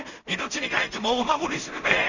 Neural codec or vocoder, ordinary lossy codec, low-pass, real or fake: codec, 16 kHz in and 24 kHz out, 0.4 kbps, LongCat-Audio-Codec, two codebook decoder; none; 7.2 kHz; fake